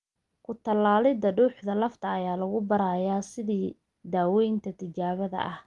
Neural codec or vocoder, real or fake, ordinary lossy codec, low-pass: none; real; Opus, 32 kbps; 10.8 kHz